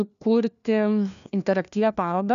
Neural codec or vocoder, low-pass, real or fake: codec, 16 kHz, 1 kbps, FunCodec, trained on LibriTTS, 50 frames a second; 7.2 kHz; fake